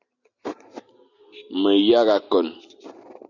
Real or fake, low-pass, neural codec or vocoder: real; 7.2 kHz; none